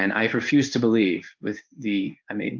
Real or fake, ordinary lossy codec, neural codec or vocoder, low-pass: fake; Opus, 24 kbps; codec, 16 kHz in and 24 kHz out, 1 kbps, XY-Tokenizer; 7.2 kHz